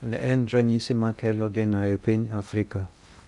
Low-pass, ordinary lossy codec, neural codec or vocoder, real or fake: 10.8 kHz; none; codec, 16 kHz in and 24 kHz out, 0.6 kbps, FocalCodec, streaming, 2048 codes; fake